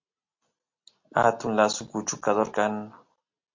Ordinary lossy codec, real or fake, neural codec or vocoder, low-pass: MP3, 48 kbps; real; none; 7.2 kHz